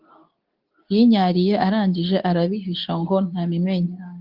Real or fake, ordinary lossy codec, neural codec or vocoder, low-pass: fake; Opus, 24 kbps; codec, 24 kHz, 0.9 kbps, WavTokenizer, medium speech release version 2; 5.4 kHz